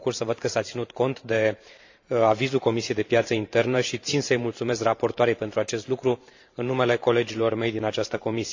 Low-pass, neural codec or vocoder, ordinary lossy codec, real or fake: 7.2 kHz; vocoder, 44.1 kHz, 128 mel bands every 512 samples, BigVGAN v2; AAC, 48 kbps; fake